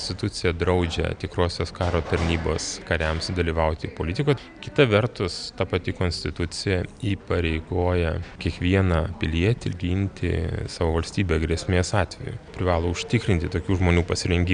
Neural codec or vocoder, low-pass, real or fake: none; 9.9 kHz; real